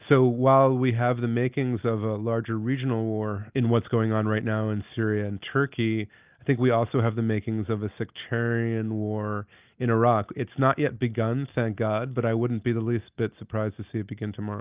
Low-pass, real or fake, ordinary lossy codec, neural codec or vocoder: 3.6 kHz; real; Opus, 24 kbps; none